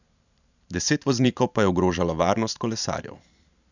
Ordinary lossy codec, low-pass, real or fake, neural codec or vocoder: none; 7.2 kHz; fake; vocoder, 44.1 kHz, 128 mel bands every 512 samples, BigVGAN v2